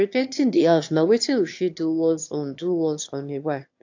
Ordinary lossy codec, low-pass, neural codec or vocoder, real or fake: AAC, 48 kbps; 7.2 kHz; autoencoder, 22.05 kHz, a latent of 192 numbers a frame, VITS, trained on one speaker; fake